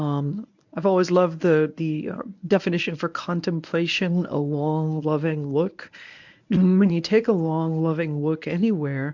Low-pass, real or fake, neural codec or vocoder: 7.2 kHz; fake; codec, 24 kHz, 0.9 kbps, WavTokenizer, medium speech release version 1